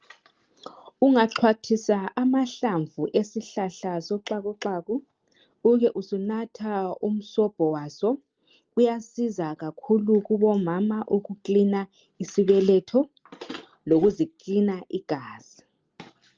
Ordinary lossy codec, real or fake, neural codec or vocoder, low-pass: Opus, 24 kbps; real; none; 7.2 kHz